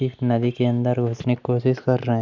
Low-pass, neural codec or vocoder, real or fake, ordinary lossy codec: 7.2 kHz; none; real; none